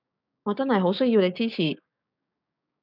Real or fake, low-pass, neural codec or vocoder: fake; 5.4 kHz; codec, 16 kHz, 6 kbps, DAC